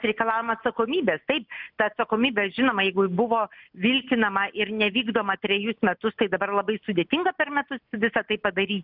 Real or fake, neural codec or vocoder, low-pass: real; none; 5.4 kHz